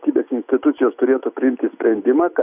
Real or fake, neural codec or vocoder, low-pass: real; none; 3.6 kHz